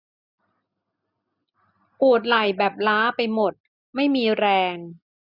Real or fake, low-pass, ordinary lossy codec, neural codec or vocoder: real; 5.4 kHz; none; none